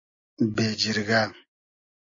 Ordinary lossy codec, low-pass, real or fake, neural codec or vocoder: AAC, 48 kbps; 7.2 kHz; real; none